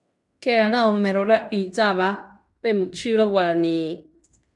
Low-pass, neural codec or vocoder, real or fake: 10.8 kHz; codec, 16 kHz in and 24 kHz out, 0.9 kbps, LongCat-Audio-Codec, fine tuned four codebook decoder; fake